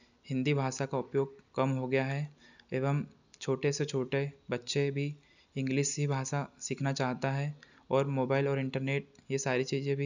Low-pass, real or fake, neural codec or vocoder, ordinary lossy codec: 7.2 kHz; real; none; none